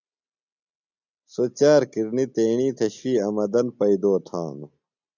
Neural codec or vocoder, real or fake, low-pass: none; real; 7.2 kHz